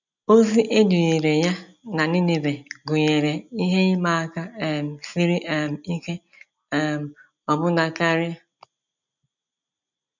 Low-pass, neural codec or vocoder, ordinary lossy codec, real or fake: 7.2 kHz; none; none; real